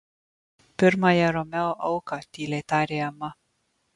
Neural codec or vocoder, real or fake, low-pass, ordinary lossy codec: none; real; 10.8 kHz; MP3, 64 kbps